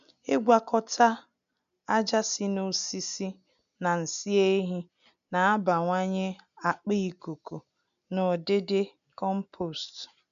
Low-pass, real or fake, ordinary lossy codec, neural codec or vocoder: 7.2 kHz; real; none; none